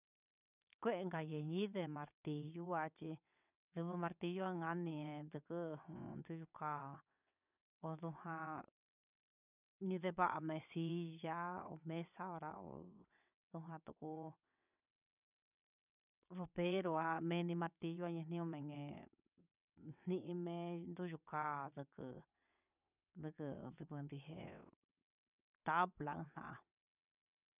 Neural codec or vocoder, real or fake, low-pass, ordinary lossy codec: vocoder, 22.05 kHz, 80 mel bands, WaveNeXt; fake; 3.6 kHz; none